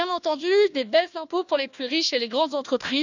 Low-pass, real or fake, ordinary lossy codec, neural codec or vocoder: 7.2 kHz; fake; none; codec, 16 kHz, 1 kbps, FunCodec, trained on Chinese and English, 50 frames a second